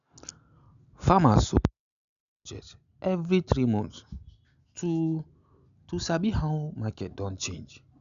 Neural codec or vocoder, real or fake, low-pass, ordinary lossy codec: none; real; 7.2 kHz; none